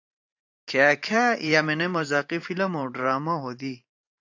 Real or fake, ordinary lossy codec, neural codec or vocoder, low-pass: fake; AAC, 48 kbps; vocoder, 44.1 kHz, 128 mel bands every 512 samples, BigVGAN v2; 7.2 kHz